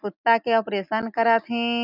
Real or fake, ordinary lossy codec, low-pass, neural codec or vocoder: real; none; 5.4 kHz; none